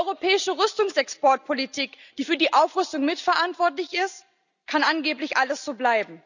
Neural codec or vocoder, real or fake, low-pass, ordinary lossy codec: none; real; 7.2 kHz; none